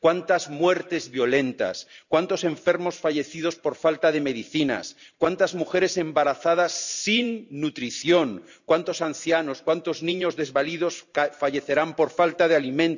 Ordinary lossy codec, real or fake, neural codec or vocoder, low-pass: none; fake; vocoder, 44.1 kHz, 128 mel bands every 512 samples, BigVGAN v2; 7.2 kHz